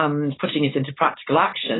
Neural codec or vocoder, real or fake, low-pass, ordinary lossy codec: codec, 16 kHz, 4.8 kbps, FACodec; fake; 7.2 kHz; AAC, 16 kbps